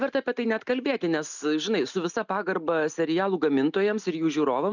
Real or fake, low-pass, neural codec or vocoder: real; 7.2 kHz; none